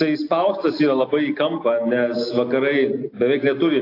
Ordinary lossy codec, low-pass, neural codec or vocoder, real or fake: AAC, 32 kbps; 5.4 kHz; none; real